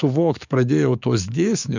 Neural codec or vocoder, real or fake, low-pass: codec, 44.1 kHz, 7.8 kbps, DAC; fake; 7.2 kHz